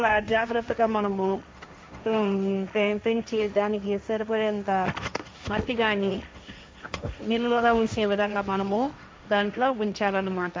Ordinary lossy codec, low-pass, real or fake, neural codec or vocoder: none; none; fake; codec, 16 kHz, 1.1 kbps, Voila-Tokenizer